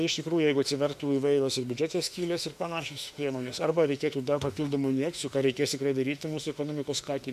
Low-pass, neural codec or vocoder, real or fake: 14.4 kHz; autoencoder, 48 kHz, 32 numbers a frame, DAC-VAE, trained on Japanese speech; fake